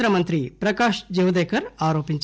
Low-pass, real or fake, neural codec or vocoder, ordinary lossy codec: none; real; none; none